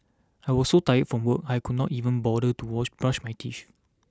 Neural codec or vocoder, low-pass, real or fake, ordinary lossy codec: none; none; real; none